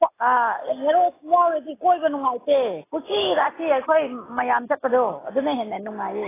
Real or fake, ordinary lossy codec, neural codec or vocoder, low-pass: real; AAC, 16 kbps; none; 3.6 kHz